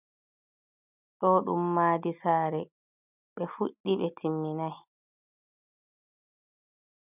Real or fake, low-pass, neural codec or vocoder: real; 3.6 kHz; none